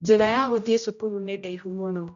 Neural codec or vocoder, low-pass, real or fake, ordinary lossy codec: codec, 16 kHz, 0.5 kbps, X-Codec, HuBERT features, trained on general audio; 7.2 kHz; fake; none